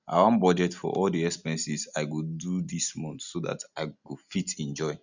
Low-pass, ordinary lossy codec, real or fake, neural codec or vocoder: 7.2 kHz; none; real; none